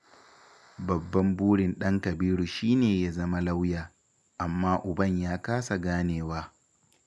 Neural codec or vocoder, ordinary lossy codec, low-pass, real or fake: none; none; none; real